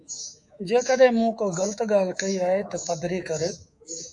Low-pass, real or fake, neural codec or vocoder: 10.8 kHz; fake; codec, 24 kHz, 3.1 kbps, DualCodec